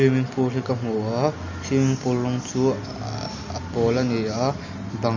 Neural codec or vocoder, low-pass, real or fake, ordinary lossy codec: none; 7.2 kHz; real; none